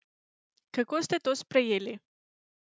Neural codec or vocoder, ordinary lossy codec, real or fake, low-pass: none; none; real; none